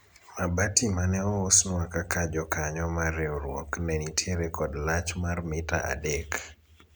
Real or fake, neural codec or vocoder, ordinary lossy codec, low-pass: real; none; none; none